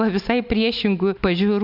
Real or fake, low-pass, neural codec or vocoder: real; 5.4 kHz; none